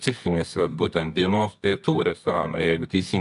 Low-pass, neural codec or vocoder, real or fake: 10.8 kHz; codec, 24 kHz, 0.9 kbps, WavTokenizer, medium music audio release; fake